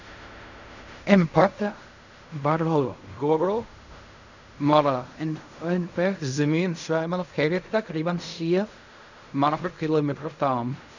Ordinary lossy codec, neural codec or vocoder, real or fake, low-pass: none; codec, 16 kHz in and 24 kHz out, 0.4 kbps, LongCat-Audio-Codec, fine tuned four codebook decoder; fake; 7.2 kHz